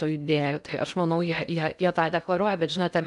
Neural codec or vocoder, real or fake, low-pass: codec, 16 kHz in and 24 kHz out, 0.8 kbps, FocalCodec, streaming, 65536 codes; fake; 10.8 kHz